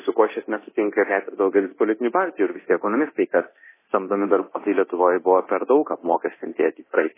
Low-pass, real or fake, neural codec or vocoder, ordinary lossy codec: 3.6 kHz; fake; codec, 24 kHz, 0.9 kbps, DualCodec; MP3, 16 kbps